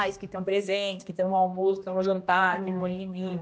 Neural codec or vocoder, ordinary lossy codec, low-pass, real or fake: codec, 16 kHz, 1 kbps, X-Codec, HuBERT features, trained on general audio; none; none; fake